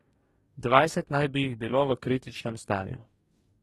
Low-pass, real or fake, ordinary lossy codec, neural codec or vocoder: 19.8 kHz; fake; AAC, 32 kbps; codec, 44.1 kHz, 2.6 kbps, DAC